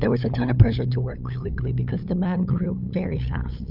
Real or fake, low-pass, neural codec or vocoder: fake; 5.4 kHz; codec, 16 kHz, 8 kbps, FunCodec, trained on LibriTTS, 25 frames a second